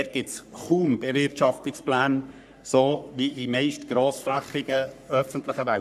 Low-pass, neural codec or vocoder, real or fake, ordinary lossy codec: 14.4 kHz; codec, 44.1 kHz, 3.4 kbps, Pupu-Codec; fake; none